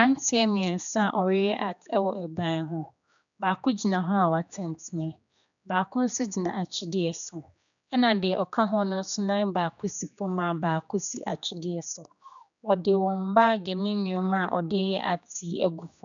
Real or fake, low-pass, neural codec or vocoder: fake; 7.2 kHz; codec, 16 kHz, 2 kbps, X-Codec, HuBERT features, trained on general audio